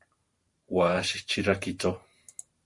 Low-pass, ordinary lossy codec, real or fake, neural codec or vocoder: 10.8 kHz; Opus, 64 kbps; fake; vocoder, 44.1 kHz, 128 mel bands every 512 samples, BigVGAN v2